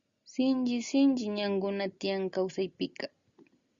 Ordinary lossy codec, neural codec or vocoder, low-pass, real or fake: Opus, 64 kbps; none; 7.2 kHz; real